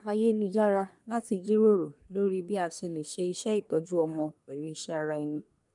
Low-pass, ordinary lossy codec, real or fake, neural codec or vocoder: 10.8 kHz; MP3, 96 kbps; fake; codec, 24 kHz, 1 kbps, SNAC